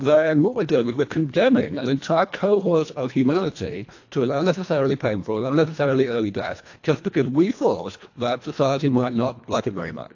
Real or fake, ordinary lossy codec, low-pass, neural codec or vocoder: fake; AAC, 48 kbps; 7.2 kHz; codec, 24 kHz, 1.5 kbps, HILCodec